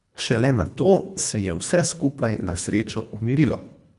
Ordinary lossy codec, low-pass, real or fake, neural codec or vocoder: none; 10.8 kHz; fake; codec, 24 kHz, 1.5 kbps, HILCodec